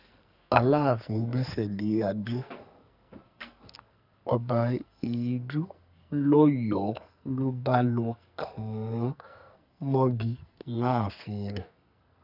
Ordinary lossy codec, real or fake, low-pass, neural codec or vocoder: none; fake; 5.4 kHz; codec, 44.1 kHz, 2.6 kbps, SNAC